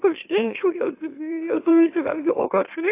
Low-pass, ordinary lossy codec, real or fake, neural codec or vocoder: 3.6 kHz; AAC, 24 kbps; fake; autoencoder, 44.1 kHz, a latent of 192 numbers a frame, MeloTTS